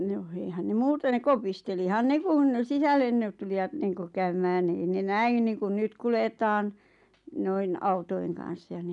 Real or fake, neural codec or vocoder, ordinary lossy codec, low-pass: real; none; none; 10.8 kHz